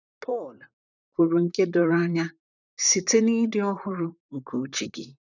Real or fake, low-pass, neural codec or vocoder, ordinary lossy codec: fake; 7.2 kHz; vocoder, 44.1 kHz, 128 mel bands, Pupu-Vocoder; none